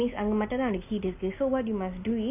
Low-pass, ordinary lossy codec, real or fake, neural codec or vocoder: 3.6 kHz; none; real; none